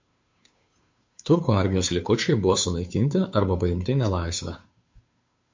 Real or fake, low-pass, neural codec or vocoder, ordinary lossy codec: fake; 7.2 kHz; codec, 16 kHz, 2 kbps, FunCodec, trained on Chinese and English, 25 frames a second; MP3, 48 kbps